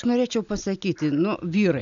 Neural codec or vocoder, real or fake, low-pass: none; real; 7.2 kHz